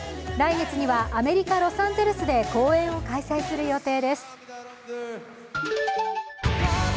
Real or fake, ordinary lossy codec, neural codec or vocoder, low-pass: real; none; none; none